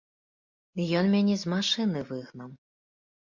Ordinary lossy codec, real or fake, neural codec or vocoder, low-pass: MP3, 64 kbps; real; none; 7.2 kHz